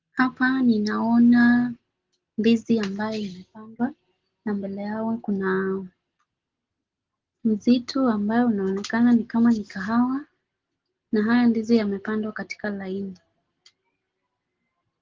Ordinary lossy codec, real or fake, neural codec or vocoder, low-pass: Opus, 16 kbps; real; none; 7.2 kHz